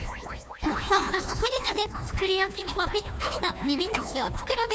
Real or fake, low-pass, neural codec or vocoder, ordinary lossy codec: fake; none; codec, 16 kHz, 1 kbps, FunCodec, trained on Chinese and English, 50 frames a second; none